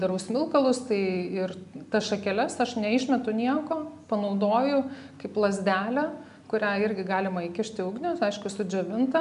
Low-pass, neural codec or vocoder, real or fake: 10.8 kHz; none; real